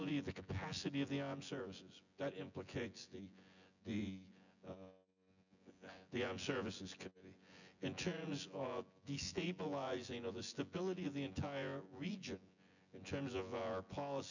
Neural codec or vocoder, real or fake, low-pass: vocoder, 24 kHz, 100 mel bands, Vocos; fake; 7.2 kHz